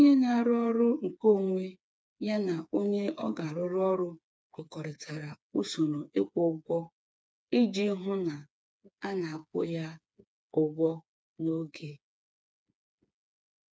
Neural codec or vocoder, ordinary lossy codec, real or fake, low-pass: codec, 16 kHz, 4 kbps, FreqCodec, smaller model; none; fake; none